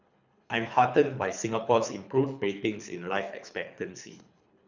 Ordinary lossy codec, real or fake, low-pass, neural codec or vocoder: none; fake; 7.2 kHz; codec, 24 kHz, 3 kbps, HILCodec